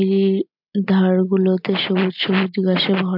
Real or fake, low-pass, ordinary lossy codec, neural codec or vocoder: real; 5.4 kHz; MP3, 48 kbps; none